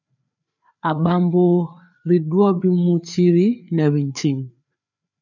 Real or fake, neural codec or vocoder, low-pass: fake; codec, 16 kHz, 4 kbps, FreqCodec, larger model; 7.2 kHz